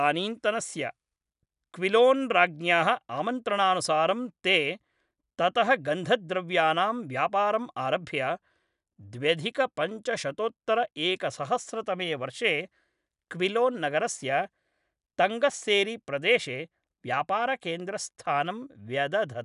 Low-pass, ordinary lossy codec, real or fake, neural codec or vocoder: 10.8 kHz; none; real; none